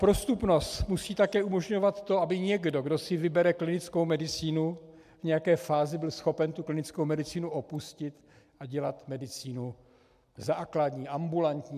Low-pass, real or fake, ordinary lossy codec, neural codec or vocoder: 14.4 kHz; real; MP3, 96 kbps; none